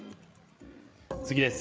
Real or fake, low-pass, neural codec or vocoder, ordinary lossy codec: fake; none; codec, 16 kHz, 8 kbps, FreqCodec, larger model; none